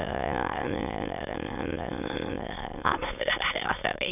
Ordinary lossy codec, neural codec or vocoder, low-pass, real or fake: none; autoencoder, 22.05 kHz, a latent of 192 numbers a frame, VITS, trained on many speakers; 3.6 kHz; fake